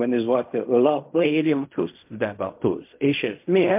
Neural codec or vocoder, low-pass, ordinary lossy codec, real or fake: codec, 16 kHz in and 24 kHz out, 0.4 kbps, LongCat-Audio-Codec, fine tuned four codebook decoder; 3.6 kHz; MP3, 32 kbps; fake